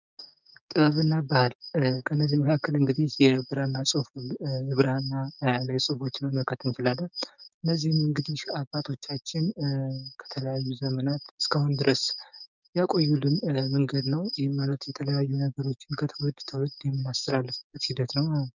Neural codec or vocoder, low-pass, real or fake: codec, 16 kHz, 6 kbps, DAC; 7.2 kHz; fake